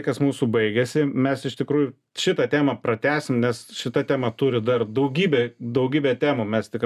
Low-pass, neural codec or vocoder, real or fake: 14.4 kHz; none; real